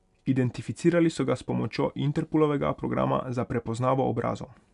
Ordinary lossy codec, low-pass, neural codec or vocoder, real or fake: none; 10.8 kHz; none; real